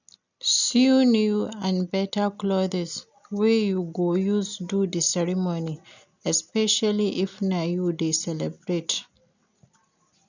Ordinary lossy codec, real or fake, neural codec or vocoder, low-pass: none; real; none; 7.2 kHz